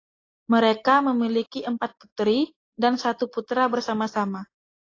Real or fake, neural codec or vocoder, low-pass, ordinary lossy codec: real; none; 7.2 kHz; AAC, 32 kbps